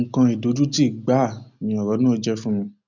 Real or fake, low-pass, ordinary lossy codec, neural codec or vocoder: real; 7.2 kHz; none; none